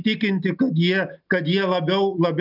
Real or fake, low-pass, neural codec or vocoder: real; 5.4 kHz; none